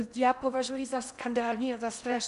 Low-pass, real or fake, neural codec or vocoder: 10.8 kHz; fake; codec, 16 kHz in and 24 kHz out, 0.8 kbps, FocalCodec, streaming, 65536 codes